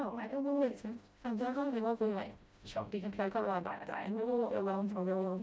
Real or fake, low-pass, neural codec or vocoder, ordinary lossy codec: fake; none; codec, 16 kHz, 0.5 kbps, FreqCodec, smaller model; none